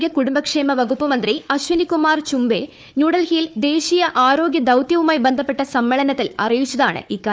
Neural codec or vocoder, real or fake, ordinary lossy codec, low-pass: codec, 16 kHz, 4 kbps, FunCodec, trained on Chinese and English, 50 frames a second; fake; none; none